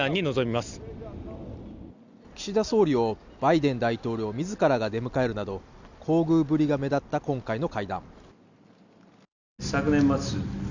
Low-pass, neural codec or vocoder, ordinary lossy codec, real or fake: 7.2 kHz; none; Opus, 64 kbps; real